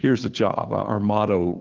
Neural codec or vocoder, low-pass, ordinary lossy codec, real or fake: codec, 16 kHz, 2 kbps, FunCodec, trained on Chinese and English, 25 frames a second; 7.2 kHz; Opus, 16 kbps; fake